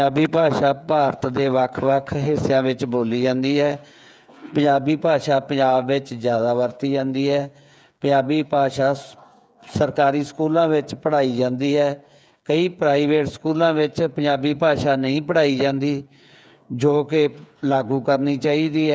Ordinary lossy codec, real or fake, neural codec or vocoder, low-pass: none; fake; codec, 16 kHz, 8 kbps, FreqCodec, smaller model; none